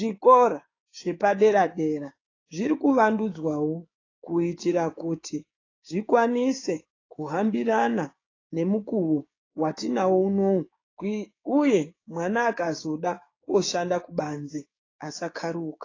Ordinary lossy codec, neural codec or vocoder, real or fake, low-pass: AAC, 32 kbps; codec, 16 kHz, 6 kbps, DAC; fake; 7.2 kHz